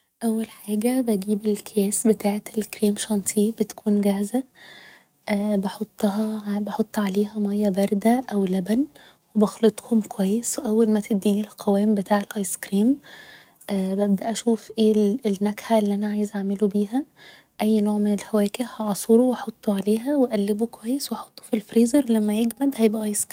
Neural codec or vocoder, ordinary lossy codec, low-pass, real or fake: codec, 44.1 kHz, 7.8 kbps, DAC; none; 19.8 kHz; fake